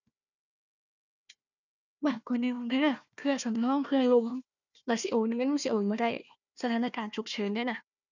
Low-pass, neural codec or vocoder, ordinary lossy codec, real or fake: 7.2 kHz; codec, 16 kHz, 1 kbps, FunCodec, trained on Chinese and English, 50 frames a second; none; fake